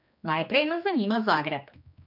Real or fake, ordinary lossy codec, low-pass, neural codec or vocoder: fake; none; 5.4 kHz; codec, 16 kHz, 2 kbps, X-Codec, HuBERT features, trained on general audio